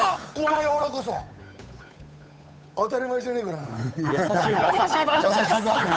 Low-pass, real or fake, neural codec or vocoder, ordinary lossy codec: none; fake; codec, 16 kHz, 8 kbps, FunCodec, trained on Chinese and English, 25 frames a second; none